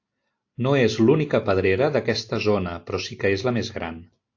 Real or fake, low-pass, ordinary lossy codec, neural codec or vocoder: real; 7.2 kHz; AAC, 48 kbps; none